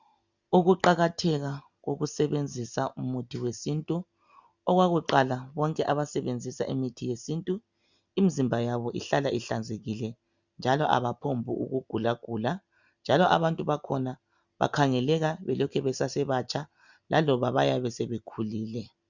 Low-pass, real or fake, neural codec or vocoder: 7.2 kHz; real; none